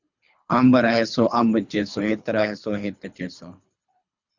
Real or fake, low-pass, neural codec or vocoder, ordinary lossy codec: fake; 7.2 kHz; codec, 24 kHz, 3 kbps, HILCodec; Opus, 64 kbps